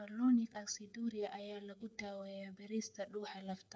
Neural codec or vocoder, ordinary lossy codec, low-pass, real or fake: codec, 16 kHz, 8 kbps, FreqCodec, smaller model; none; none; fake